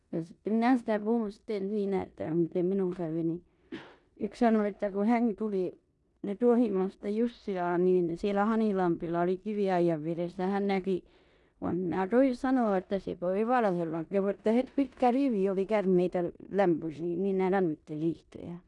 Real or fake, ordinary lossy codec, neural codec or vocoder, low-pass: fake; none; codec, 16 kHz in and 24 kHz out, 0.9 kbps, LongCat-Audio-Codec, four codebook decoder; 10.8 kHz